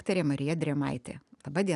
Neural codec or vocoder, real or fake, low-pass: none; real; 10.8 kHz